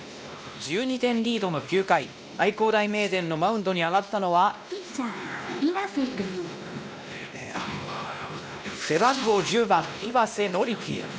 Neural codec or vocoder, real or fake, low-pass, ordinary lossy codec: codec, 16 kHz, 1 kbps, X-Codec, WavLM features, trained on Multilingual LibriSpeech; fake; none; none